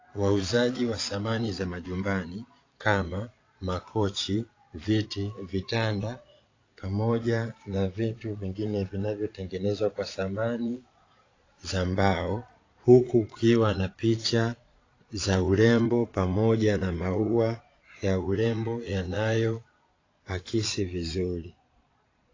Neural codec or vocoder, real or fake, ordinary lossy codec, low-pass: vocoder, 22.05 kHz, 80 mel bands, Vocos; fake; AAC, 32 kbps; 7.2 kHz